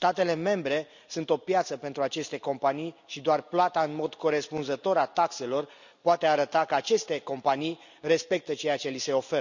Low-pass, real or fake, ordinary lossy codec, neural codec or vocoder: 7.2 kHz; real; none; none